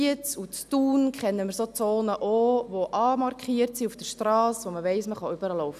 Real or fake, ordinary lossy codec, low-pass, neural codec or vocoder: real; none; 14.4 kHz; none